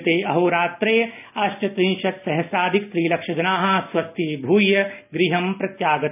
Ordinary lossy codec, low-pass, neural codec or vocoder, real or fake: none; 3.6 kHz; none; real